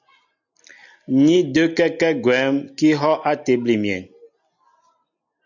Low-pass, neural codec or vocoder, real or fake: 7.2 kHz; none; real